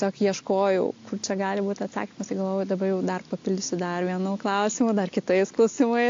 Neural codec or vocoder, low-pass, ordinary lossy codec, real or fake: none; 7.2 kHz; AAC, 48 kbps; real